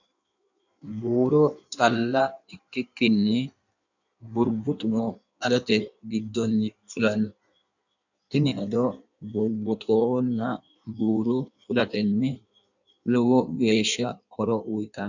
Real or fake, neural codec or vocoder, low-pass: fake; codec, 16 kHz in and 24 kHz out, 1.1 kbps, FireRedTTS-2 codec; 7.2 kHz